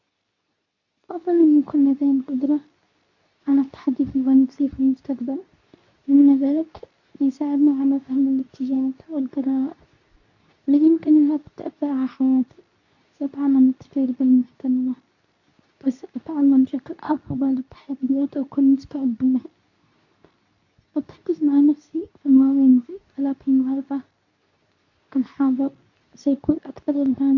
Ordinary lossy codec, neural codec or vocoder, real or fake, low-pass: none; codec, 24 kHz, 0.9 kbps, WavTokenizer, medium speech release version 2; fake; 7.2 kHz